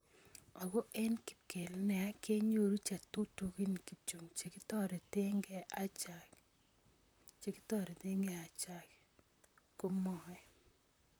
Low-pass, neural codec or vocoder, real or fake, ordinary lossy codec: none; vocoder, 44.1 kHz, 128 mel bands, Pupu-Vocoder; fake; none